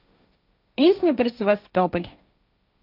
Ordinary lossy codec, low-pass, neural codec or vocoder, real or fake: none; 5.4 kHz; codec, 16 kHz, 1.1 kbps, Voila-Tokenizer; fake